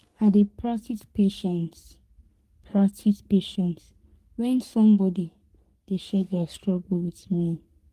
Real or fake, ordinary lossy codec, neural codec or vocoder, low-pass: fake; Opus, 24 kbps; codec, 44.1 kHz, 3.4 kbps, Pupu-Codec; 14.4 kHz